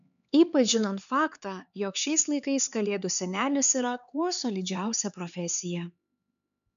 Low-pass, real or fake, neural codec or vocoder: 7.2 kHz; fake; codec, 16 kHz, 4 kbps, X-Codec, HuBERT features, trained on LibriSpeech